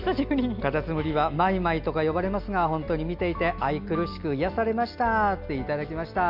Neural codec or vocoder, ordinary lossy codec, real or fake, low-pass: none; none; real; 5.4 kHz